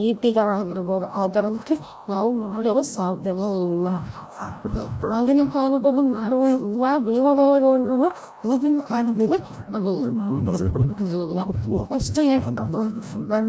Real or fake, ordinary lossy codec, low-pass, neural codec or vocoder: fake; none; none; codec, 16 kHz, 0.5 kbps, FreqCodec, larger model